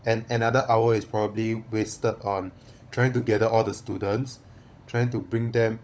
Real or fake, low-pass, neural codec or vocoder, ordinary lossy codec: fake; none; codec, 16 kHz, 16 kbps, FunCodec, trained on LibriTTS, 50 frames a second; none